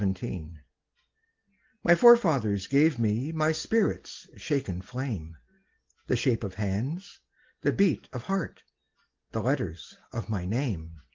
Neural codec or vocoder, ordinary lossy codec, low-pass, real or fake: none; Opus, 16 kbps; 7.2 kHz; real